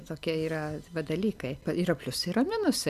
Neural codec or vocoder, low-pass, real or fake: none; 14.4 kHz; real